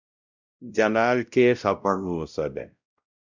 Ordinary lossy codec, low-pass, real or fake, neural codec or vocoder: Opus, 64 kbps; 7.2 kHz; fake; codec, 16 kHz, 0.5 kbps, X-Codec, WavLM features, trained on Multilingual LibriSpeech